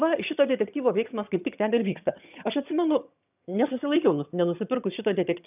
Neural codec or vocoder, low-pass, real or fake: vocoder, 22.05 kHz, 80 mel bands, HiFi-GAN; 3.6 kHz; fake